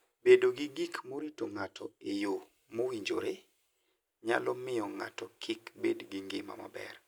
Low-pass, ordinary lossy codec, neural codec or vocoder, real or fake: none; none; none; real